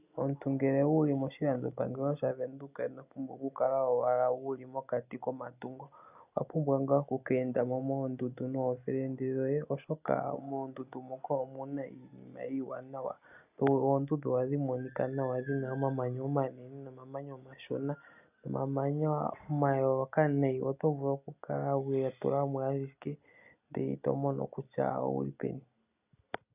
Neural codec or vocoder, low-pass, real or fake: none; 3.6 kHz; real